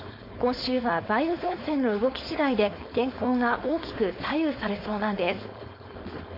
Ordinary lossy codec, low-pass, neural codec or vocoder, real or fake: MP3, 32 kbps; 5.4 kHz; codec, 16 kHz, 4.8 kbps, FACodec; fake